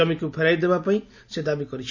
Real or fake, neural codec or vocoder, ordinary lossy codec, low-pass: real; none; none; 7.2 kHz